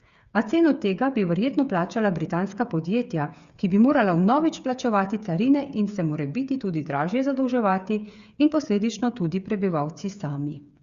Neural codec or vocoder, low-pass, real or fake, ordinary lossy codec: codec, 16 kHz, 8 kbps, FreqCodec, smaller model; 7.2 kHz; fake; Opus, 64 kbps